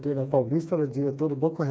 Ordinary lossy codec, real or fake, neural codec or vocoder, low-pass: none; fake; codec, 16 kHz, 2 kbps, FreqCodec, smaller model; none